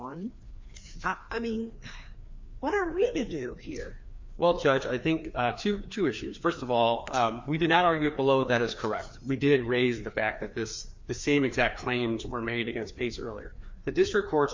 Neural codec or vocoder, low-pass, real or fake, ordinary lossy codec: codec, 16 kHz, 2 kbps, FreqCodec, larger model; 7.2 kHz; fake; MP3, 48 kbps